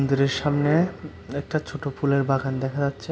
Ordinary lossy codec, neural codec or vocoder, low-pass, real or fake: none; none; none; real